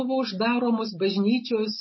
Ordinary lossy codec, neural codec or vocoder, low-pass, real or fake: MP3, 24 kbps; none; 7.2 kHz; real